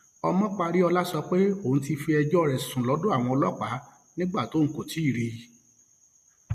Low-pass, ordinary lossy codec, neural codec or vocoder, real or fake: 14.4 kHz; MP3, 64 kbps; none; real